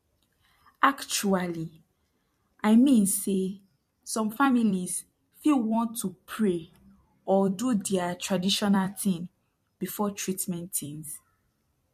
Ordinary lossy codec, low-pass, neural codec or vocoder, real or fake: MP3, 64 kbps; 14.4 kHz; vocoder, 44.1 kHz, 128 mel bands every 256 samples, BigVGAN v2; fake